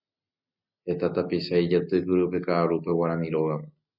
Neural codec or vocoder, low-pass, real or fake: none; 5.4 kHz; real